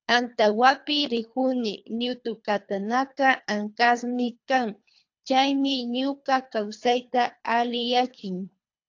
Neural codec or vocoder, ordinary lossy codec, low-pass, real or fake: codec, 24 kHz, 3 kbps, HILCodec; AAC, 48 kbps; 7.2 kHz; fake